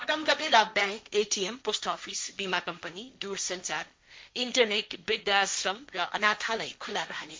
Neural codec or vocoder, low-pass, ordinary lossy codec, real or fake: codec, 16 kHz, 1.1 kbps, Voila-Tokenizer; none; none; fake